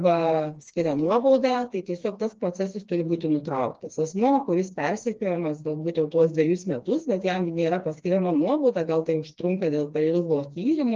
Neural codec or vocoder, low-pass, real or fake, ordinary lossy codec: codec, 16 kHz, 2 kbps, FreqCodec, smaller model; 7.2 kHz; fake; Opus, 24 kbps